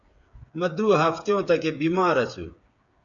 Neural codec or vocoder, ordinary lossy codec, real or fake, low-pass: codec, 16 kHz, 8 kbps, FreqCodec, smaller model; AAC, 64 kbps; fake; 7.2 kHz